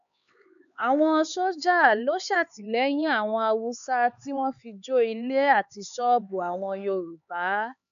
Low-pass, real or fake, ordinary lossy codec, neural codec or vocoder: 7.2 kHz; fake; none; codec, 16 kHz, 4 kbps, X-Codec, HuBERT features, trained on LibriSpeech